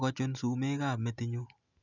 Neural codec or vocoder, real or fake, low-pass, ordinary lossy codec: none; real; 7.2 kHz; none